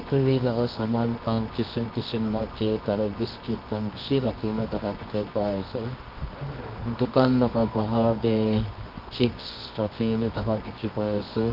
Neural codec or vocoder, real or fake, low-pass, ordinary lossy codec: codec, 24 kHz, 0.9 kbps, WavTokenizer, medium music audio release; fake; 5.4 kHz; Opus, 32 kbps